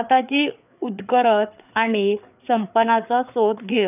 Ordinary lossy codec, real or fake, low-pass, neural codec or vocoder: none; fake; 3.6 kHz; codec, 16 kHz, 4 kbps, FreqCodec, larger model